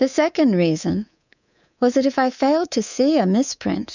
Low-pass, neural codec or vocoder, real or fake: 7.2 kHz; codec, 24 kHz, 3.1 kbps, DualCodec; fake